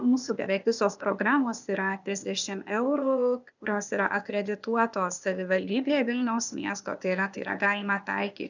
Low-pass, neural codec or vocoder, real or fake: 7.2 kHz; codec, 16 kHz, 0.8 kbps, ZipCodec; fake